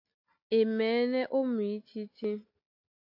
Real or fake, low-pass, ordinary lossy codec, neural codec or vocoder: real; 5.4 kHz; AAC, 48 kbps; none